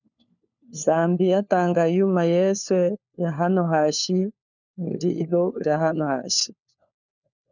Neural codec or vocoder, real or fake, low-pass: codec, 16 kHz, 4 kbps, FunCodec, trained on LibriTTS, 50 frames a second; fake; 7.2 kHz